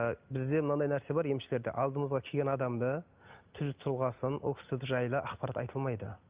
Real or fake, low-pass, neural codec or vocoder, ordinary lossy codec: real; 3.6 kHz; none; Opus, 24 kbps